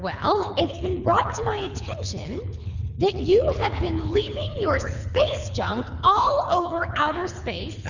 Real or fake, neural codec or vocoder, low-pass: fake; codec, 24 kHz, 3 kbps, HILCodec; 7.2 kHz